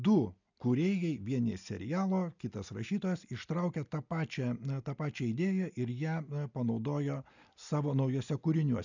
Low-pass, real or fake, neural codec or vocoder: 7.2 kHz; real; none